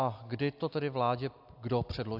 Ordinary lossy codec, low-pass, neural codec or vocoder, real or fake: AAC, 48 kbps; 5.4 kHz; none; real